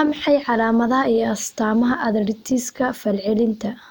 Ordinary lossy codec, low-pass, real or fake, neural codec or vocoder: none; none; real; none